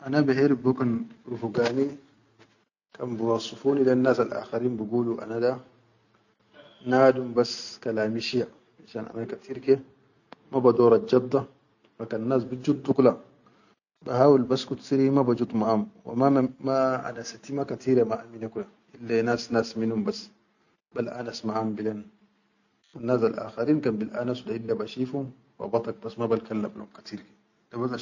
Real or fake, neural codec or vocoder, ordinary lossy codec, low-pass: real; none; none; 7.2 kHz